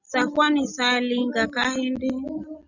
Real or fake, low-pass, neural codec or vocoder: real; 7.2 kHz; none